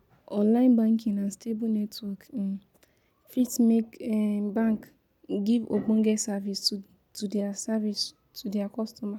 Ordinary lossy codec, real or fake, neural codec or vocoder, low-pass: none; real; none; 19.8 kHz